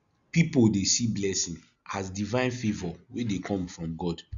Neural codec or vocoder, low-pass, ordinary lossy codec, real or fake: none; 7.2 kHz; Opus, 64 kbps; real